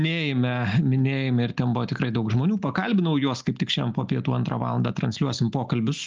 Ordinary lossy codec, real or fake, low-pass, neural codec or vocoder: Opus, 32 kbps; real; 7.2 kHz; none